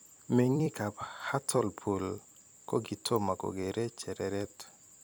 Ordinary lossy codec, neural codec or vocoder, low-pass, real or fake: none; vocoder, 44.1 kHz, 128 mel bands every 256 samples, BigVGAN v2; none; fake